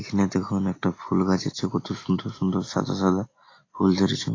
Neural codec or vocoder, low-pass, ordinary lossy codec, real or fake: none; 7.2 kHz; AAC, 32 kbps; real